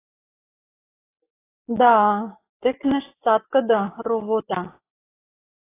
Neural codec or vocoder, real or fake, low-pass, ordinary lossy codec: none; real; 3.6 kHz; AAC, 16 kbps